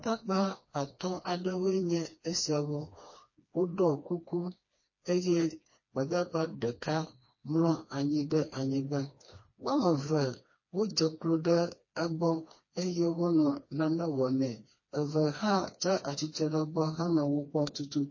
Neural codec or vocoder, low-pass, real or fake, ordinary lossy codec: codec, 16 kHz, 2 kbps, FreqCodec, smaller model; 7.2 kHz; fake; MP3, 32 kbps